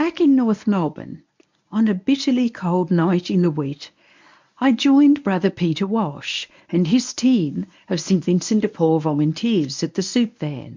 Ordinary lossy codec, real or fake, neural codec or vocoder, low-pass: MP3, 64 kbps; fake; codec, 24 kHz, 0.9 kbps, WavTokenizer, medium speech release version 1; 7.2 kHz